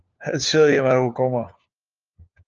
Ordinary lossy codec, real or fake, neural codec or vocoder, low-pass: Opus, 24 kbps; fake; codec, 16 kHz, 4 kbps, FunCodec, trained on LibriTTS, 50 frames a second; 7.2 kHz